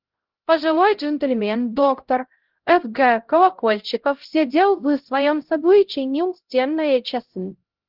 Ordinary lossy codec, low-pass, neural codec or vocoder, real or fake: Opus, 16 kbps; 5.4 kHz; codec, 16 kHz, 0.5 kbps, X-Codec, HuBERT features, trained on LibriSpeech; fake